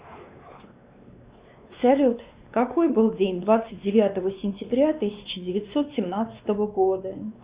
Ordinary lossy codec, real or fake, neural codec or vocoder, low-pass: Opus, 64 kbps; fake; codec, 16 kHz, 2 kbps, X-Codec, WavLM features, trained on Multilingual LibriSpeech; 3.6 kHz